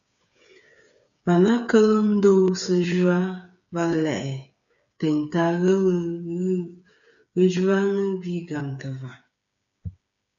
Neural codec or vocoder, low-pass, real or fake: codec, 16 kHz, 8 kbps, FreqCodec, smaller model; 7.2 kHz; fake